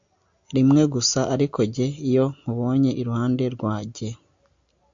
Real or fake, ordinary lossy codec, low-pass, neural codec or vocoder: real; AAC, 64 kbps; 7.2 kHz; none